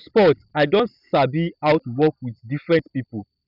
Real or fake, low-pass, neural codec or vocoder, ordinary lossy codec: real; 5.4 kHz; none; none